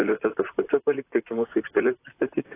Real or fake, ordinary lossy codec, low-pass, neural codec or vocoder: fake; AAC, 24 kbps; 3.6 kHz; vocoder, 44.1 kHz, 128 mel bands, Pupu-Vocoder